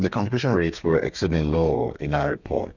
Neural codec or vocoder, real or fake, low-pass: codec, 32 kHz, 1.9 kbps, SNAC; fake; 7.2 kHz